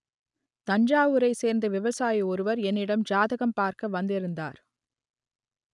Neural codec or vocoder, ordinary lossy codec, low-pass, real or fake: none; none; 10.8 kHz; real